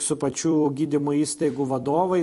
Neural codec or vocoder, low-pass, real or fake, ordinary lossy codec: vocoder, 44.1 kHz, 128 mel bands every 256 samples, BigVGAN v2; 14.4 kHz; fake; MP3, 48 kbps